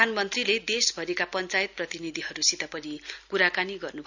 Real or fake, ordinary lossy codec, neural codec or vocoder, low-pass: real; none; none; 7.2 kHz